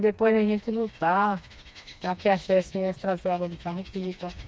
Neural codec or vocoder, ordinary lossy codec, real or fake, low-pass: codec, 16 kHz, 2 kbps, FreqCodec, smaller model; none; fake; none